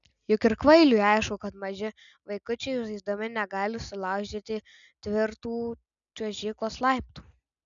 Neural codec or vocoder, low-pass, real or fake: none; 7.2 kHz; real